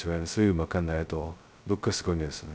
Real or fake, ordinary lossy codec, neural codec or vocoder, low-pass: fake; none; codec, 16 kHz, 0.2 kbps, FocalCodec; none